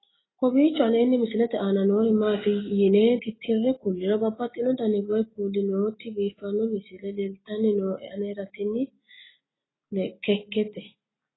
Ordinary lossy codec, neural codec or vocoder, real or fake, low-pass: AAC, 16 kbps; none; real; 7.2 kHz